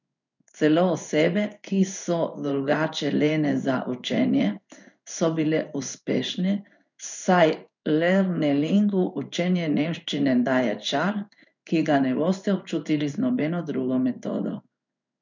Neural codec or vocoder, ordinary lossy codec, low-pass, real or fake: codec, 16 kHz in and 24 kHz out, 1 kbps, XY-Tokenizer; none; 7.2 kHz; fake